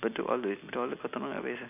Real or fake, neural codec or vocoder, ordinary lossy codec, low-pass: real; none; none; 3.6 kHz